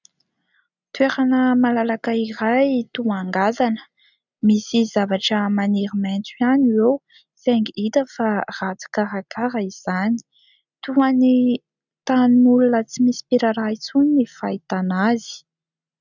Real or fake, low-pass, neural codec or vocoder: real; 7.2 kHz; none